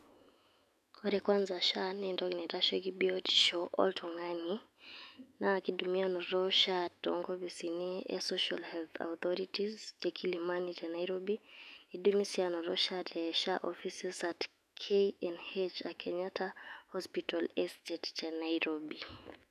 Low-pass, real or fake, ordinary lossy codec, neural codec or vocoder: 14.4 kHz; fake; none; autoencoder, 48 kHz, 128 numbers a frame, DAC-VAE, trained on Japanese speech